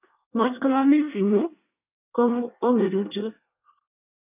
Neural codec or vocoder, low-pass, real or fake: codec, 24 kHz, 1 kbps, SNAC; 3.6 kHz; fake